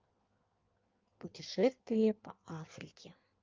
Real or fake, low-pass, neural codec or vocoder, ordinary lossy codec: fake; 7.2 kHz; codec, 16 kHz in and 24 kHz out, 1.1 kbps, FireRedTTS-2 codec; Opus, 24 kbps